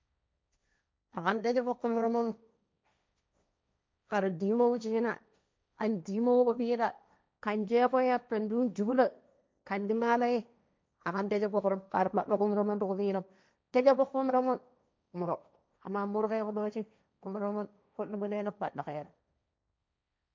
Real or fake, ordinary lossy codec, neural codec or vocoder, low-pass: fake; none; codec, 16 kHz, 1.1 kbps, Voila-Tokenizer; none